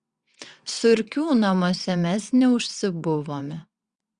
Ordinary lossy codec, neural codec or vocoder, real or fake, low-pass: Opus, 64 kbps; vocoder, 22.05 kHz, 80 mel bands, WaveNeXt; fake; 9.9 kHz